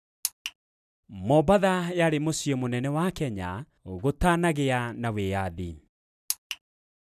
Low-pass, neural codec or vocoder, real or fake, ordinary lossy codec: 14.4 kHz; none; real; none